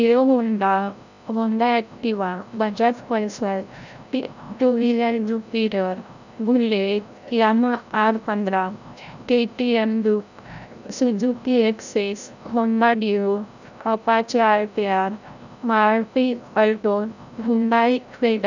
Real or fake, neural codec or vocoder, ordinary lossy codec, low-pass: fake; codec, 16 kHz, 0.5 kbps, FreqCodec, larger model; none; 7.2 kHz